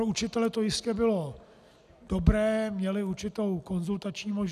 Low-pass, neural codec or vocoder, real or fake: 14.4 kHz; none; real